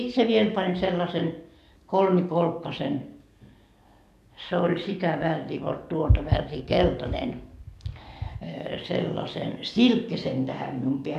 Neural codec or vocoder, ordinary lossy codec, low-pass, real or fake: codec, 44.1 kHz, 7.8 kbps, DAC; none; 14.4 kHz; fake